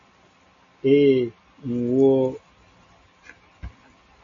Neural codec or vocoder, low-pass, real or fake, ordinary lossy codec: none; 7.2 kHz; real; MP3, 32 kbps